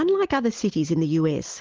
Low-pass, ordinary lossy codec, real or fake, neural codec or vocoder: 7.2 kHz; Opus, 16 kbps; real; none